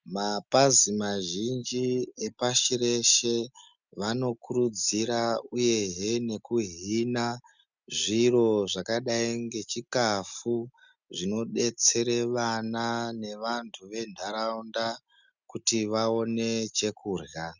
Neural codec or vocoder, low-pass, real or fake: none; 7.2 kHz; real